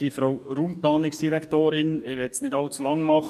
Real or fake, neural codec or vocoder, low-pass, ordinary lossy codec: fake; codec, 44.1 kHz, 2.6 kbps, DAC; 14.4 kHz; none